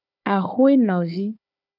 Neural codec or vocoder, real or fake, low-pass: codec, 16 kHz, 4 kbps, FunCodec, trained on Chinese and English, 50 frames a second; fake; 5.4 kHz